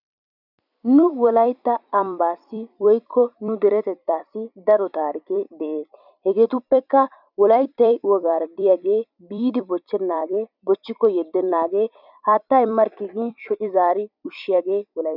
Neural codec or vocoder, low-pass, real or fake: vocoder, 44.1 kHz, 128 mel bands every 256 samples, BigVGAN v2; 5.4 kHz; fake